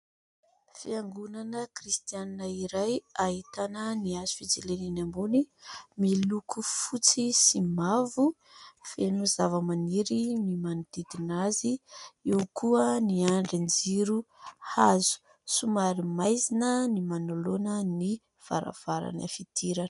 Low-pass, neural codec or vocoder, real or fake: 10.8 kHz; none; real